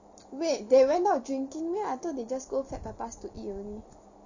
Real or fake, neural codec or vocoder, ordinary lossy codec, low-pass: real; none; none; 7.2 kHz